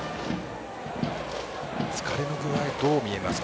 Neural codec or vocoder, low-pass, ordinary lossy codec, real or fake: none; none; none; real